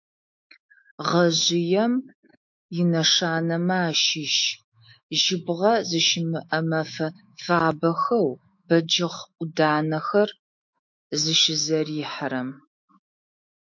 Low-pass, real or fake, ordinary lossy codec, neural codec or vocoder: 7.2 kHz; fake; MP3, 48 kbps; autoencoder, 48 kHz, 128 numbers a frame, DAC-VAE, trained on Japanese speech